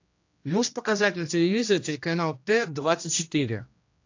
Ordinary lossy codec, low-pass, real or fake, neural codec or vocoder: AAC, 48 kbps; 7.2 kHz; fake; codec, 16 kHz, 1 kbps, X-Codec, HuBERT features, trained on general audio